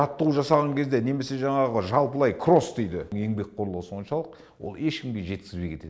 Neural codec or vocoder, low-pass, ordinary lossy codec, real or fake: none; none; none; real